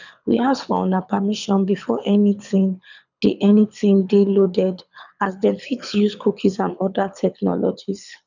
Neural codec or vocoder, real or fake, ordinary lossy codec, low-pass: codec, 24 kHz, 6 kbps, HILCodec; fake; none; 7.2 kHz